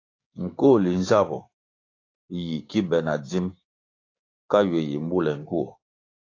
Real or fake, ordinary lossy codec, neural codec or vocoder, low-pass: fake; AAC, 32 kbps; codec, 16 kHz, 6 kbps, DAC; 7.2 kHz